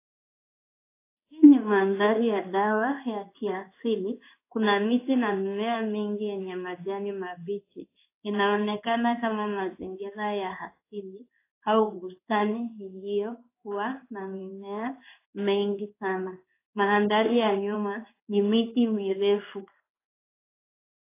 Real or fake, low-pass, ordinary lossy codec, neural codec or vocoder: fake; 3.6 kHz; AAC, 24 kbps; codec, 16 kHz in and 24 kHz out, 1 kbps, XY-Tokenizer